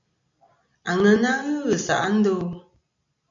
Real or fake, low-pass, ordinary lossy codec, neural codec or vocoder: real; 7.2 kHz; MP3, 64 kbps; none